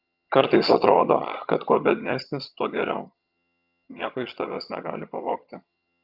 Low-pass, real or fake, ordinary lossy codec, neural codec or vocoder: 5.4 kHz; fake; Opus, 64 kbps; vocoder, 22.05 kHz, 80 mel bands, HiFi-GAN